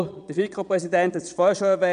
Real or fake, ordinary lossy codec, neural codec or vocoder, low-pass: fake; none; vocoder, 22.05 kHz, 80 mel bands, Vocos; 9.9 kHz